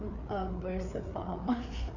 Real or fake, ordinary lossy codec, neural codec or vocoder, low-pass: fake; none; codec, 16 kHz, 4 kbps, FreqCodec, larger model; 7.2 kHz